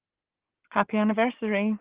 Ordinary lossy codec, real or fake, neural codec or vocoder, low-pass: Opus, 16 kbps; real; none; 3.6 kHz